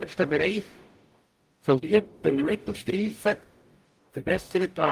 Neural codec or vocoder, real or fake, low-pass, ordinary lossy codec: codec, 44.1 kHz, 0.9 kbps, DAC; fake; 14.4 kHz; Opus, 32 kbps